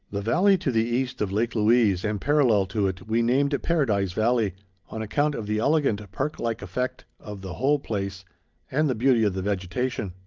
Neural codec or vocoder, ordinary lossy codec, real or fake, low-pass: none; Opus, 24 kbps; real; 7.2 kHz